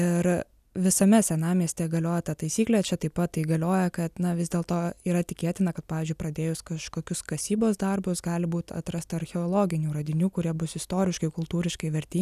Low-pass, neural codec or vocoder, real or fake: 14.4 kHz; none; real